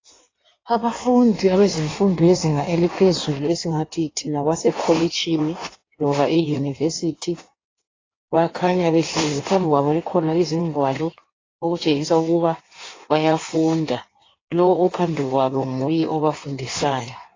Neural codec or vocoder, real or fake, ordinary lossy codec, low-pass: codec, 16 kHz in and 24 kHz out, 1.1 kbps, FireRedTTS-2 codec; fake; AAC, 32 kbps; 7.2 kHz